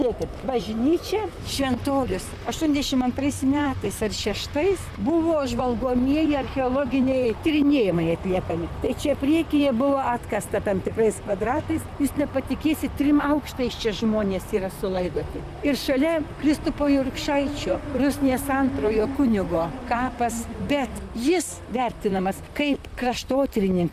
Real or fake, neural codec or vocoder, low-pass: fake; vocoder, 44.1 kHz, 128 mel bands, Pupu-Vocoder; 14.4 kHz